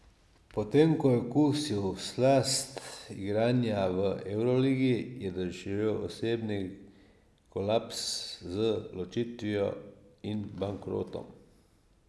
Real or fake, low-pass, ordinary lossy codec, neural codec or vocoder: real; none; none; none